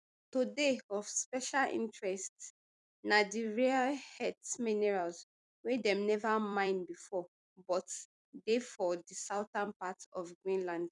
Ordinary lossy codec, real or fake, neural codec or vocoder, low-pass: none; fake; vocoder, 44.1 kHz, 128 mel bands every 256 samples, BigVGAN v2; 10.8 kHz